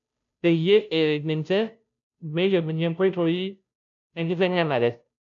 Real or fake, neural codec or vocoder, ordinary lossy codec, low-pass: fake; codec, 16 kHz, 0.5 kbps, FunCodec, trained on Chinese and English, 25 frames a second; none; 7.2 kHz